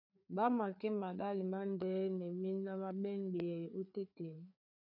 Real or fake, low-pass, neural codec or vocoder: fake; 5.4 kHz; codec, 16 kHz, 4 kbps, FreqCodec, larger model